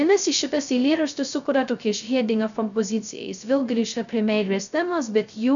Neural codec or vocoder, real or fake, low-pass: codec, 16 kHz, 0.2 kbps, FocalCodec; fake; 7.2 kHz